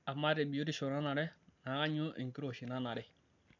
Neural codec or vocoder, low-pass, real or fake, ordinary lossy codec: none; 7.2 kHz; real; none